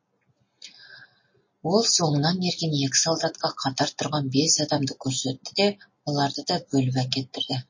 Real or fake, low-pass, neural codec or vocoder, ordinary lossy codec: real; 7.2 kHz; none; MP3, 32 kbps